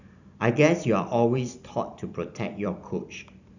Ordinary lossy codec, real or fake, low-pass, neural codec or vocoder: none; fake; 7.2 kHz; vocoder, 44.1 kHz, 128 mel bands every 256 samples, BigVGAN v2